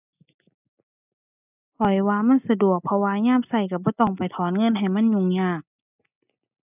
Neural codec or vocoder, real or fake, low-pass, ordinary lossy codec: none; real; 3.6 kHz; none